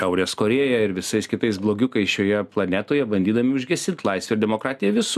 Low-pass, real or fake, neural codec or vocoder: 14.4 kHz; real; none